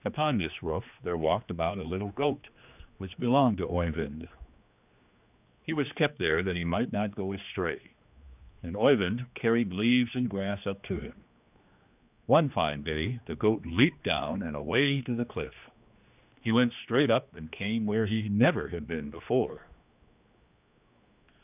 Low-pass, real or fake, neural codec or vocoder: 3.6 kHz; fake; codec, 16 kHz, 2 kbps, X-Codec, HuBERT features, trained on general audio